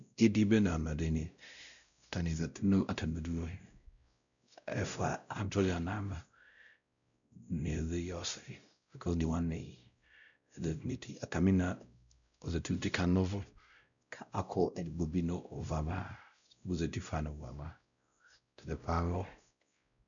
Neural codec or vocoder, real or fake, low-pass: codec, 16 kHz, 0.5 kbps, X-Codec, WavLM features, trained on Multilingual LibriSpeech; fake; 7.2 kHz